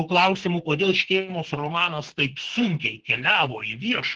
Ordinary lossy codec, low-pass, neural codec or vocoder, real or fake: Opus, 16 kbps; 9.9 kHz; codec, 32 kHz, 1.9 kbps, SNAC; fake